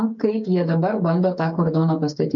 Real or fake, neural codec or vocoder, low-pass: fake; codec, 16 kHz, 8 kbps, FreqCodec, smaller model; 7.2 kHz